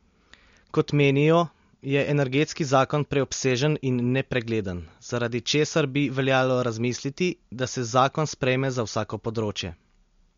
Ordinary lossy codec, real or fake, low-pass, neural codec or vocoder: MP3, 48 kbps; real; 7.2 kHz; none